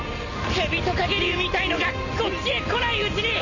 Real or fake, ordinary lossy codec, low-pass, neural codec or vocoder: real; none; 7.2 kHz; none